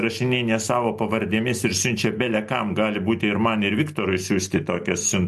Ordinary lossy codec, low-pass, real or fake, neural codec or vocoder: MP3, 64 kbps; 14.4 kHz; real; none